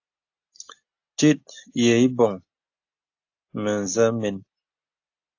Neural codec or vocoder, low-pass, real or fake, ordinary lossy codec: none; 7.2 kHz; real; AAC, 48 kbps